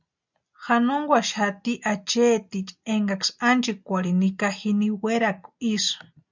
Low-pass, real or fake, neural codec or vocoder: 7.2 kHz; real; none